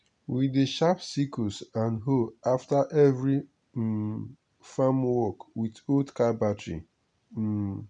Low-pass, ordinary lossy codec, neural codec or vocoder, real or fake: 9.9 kHz; none; none; real